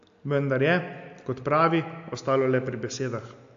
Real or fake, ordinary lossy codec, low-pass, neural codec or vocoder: real; AAC, 64 kbps; 7.2 kHz; none